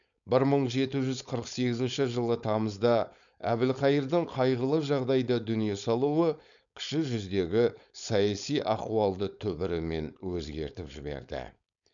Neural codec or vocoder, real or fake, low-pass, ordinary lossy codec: codec, 16 kHz, 4.8 kbps, FACodec; fake; 7.2 kHz; none